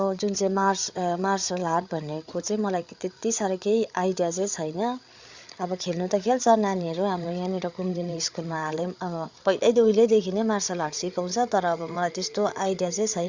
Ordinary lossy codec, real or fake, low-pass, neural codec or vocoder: Opus, 64 kbps; fake; 7.2 kHz; codec, 16 kHz, 8 kbps, FreqCodec, larger model